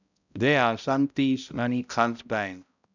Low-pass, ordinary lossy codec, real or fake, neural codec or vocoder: 7.2 kHz; none; fake; codec, 16 kHz, 0.5 kbps, X-Codec, HuBERT features, trained on balanced general audio